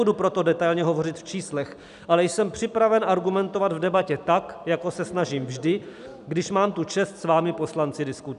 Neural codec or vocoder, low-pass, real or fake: none; 10.8 kHz; real